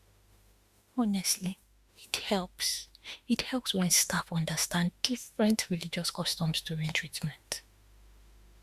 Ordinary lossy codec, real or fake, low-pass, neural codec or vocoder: Opus, 64 kbps; fake; 14.4 kHz; autoencoder, 48 kHz, 32 numbers a frame, DAC-VAE, trained on Japanese speech